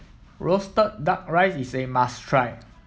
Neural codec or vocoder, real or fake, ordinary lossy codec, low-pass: none; real; none; none